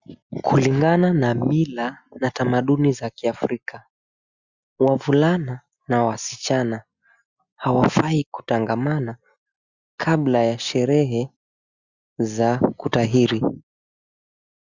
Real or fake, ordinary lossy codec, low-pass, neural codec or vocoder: real; Opus, 64 kbps; 7.2 kHz; none